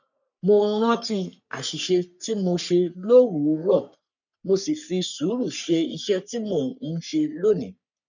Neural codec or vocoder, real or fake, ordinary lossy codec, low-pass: codec, 44.1 kHz, 3.4 kbps, Pupu-Codec; fake; none; 7.2 kHz